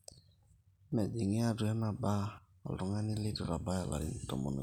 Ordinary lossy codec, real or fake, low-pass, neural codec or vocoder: none; real; 19.8 kHz; none